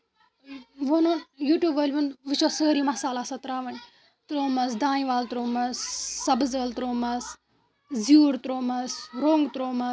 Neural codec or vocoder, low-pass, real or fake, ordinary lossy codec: none; none; real; none